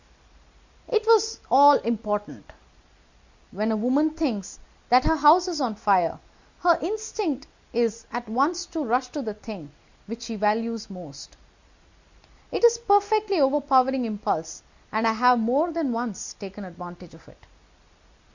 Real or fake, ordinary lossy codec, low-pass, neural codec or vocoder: real; Opus, 64 kbps; 7.2 kHz; none